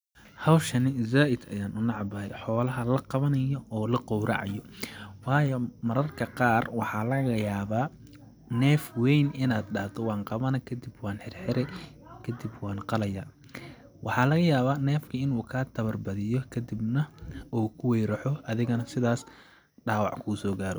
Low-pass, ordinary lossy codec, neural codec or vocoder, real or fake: none; none; none; real